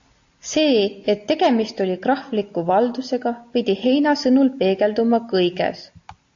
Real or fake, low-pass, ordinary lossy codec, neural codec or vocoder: real; 7.2 kHz; AAC, 48 kbps; none